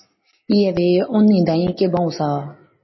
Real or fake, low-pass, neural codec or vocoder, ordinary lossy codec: real; 7.2 kHz; none; MP3, 24 kbps